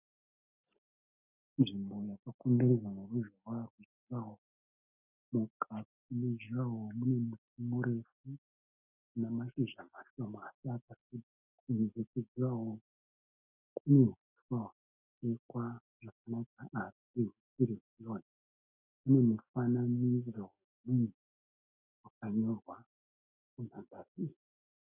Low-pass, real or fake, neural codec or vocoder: 3.6 kHz; real; none